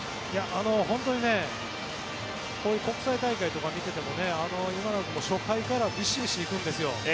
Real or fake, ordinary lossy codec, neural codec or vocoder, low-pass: real; none; none; none